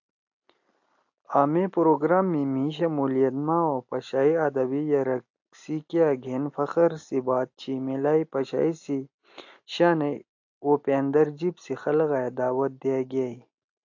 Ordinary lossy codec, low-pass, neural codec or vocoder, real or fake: AAC, 48 kbps; 7.2 kHz; none; real